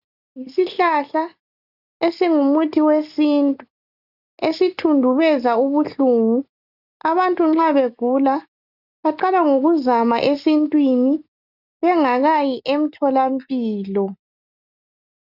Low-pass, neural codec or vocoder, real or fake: 5.4 kHz; none; real